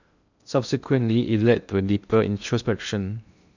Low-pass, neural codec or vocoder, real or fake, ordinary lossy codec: 7.2 kHz; codec, 16 kHz in and 24 kHz out, 0.6 kbps, FocalCodec, streaming, 2048 codes; fake; none